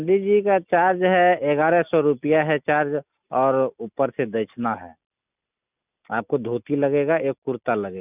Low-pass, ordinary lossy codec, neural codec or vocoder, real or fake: 3.6 kHz; none; none; real